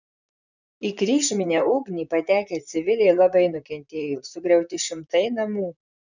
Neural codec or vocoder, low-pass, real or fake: vocoder, 44.1 kHz, 128 mel bands, Pupu-Vocoder; 7.2 kHz; fake